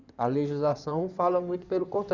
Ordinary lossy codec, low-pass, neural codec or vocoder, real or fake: Opus, 64 kbps; 7.2 kHz; codec, 16 kHz in and 24 kHz out, 2.2 kbps, FireRedTTS-2 codec; fake